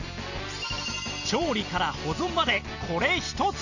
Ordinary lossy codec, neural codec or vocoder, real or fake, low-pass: none; none; real; 7.2 kHz